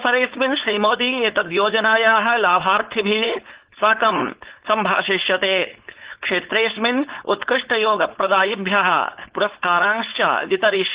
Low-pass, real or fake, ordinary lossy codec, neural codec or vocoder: 3.6 kHz; fake; Opus, 16 kbps; codec, 16 kHz, 4.8 kbps, FACodec